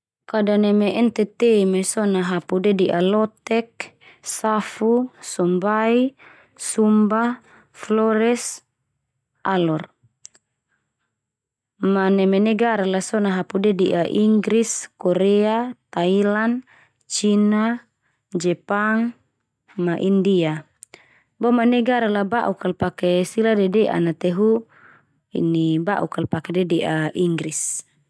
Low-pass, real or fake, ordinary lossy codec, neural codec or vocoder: none; real; none; none